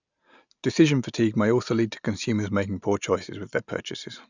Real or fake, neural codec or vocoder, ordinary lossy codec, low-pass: real; none; none; 7.2 kHz